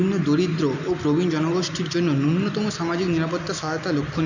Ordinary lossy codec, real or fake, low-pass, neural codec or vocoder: none; real; 7.2 kHz; none